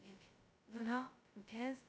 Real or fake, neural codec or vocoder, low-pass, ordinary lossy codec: fake; codec, 16 kHz, 0.2 kbps, FocalCodec; none; none